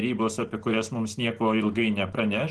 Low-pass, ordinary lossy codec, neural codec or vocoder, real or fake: 10.8 kHz; Opus, 16 kbps; none; real